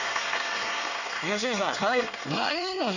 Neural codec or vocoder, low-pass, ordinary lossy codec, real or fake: codec, 24 kHz, 1 kbps, SNAC; 7.2 kHz; none; fake